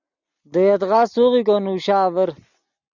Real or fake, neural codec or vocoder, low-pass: real; none; 7.2 kHz